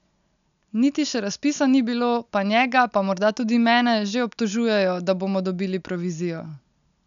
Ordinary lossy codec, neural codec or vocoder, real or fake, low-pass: none; none; real; 7.2 kHz